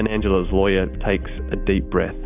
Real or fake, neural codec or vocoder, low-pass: real; none; 3.6 kHz